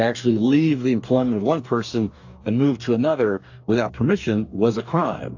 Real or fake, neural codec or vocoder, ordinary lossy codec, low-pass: fake; codec, 44.1 kHz, 2.6 kbps, DAC; AAC, 48 kbps; 7.2 kHz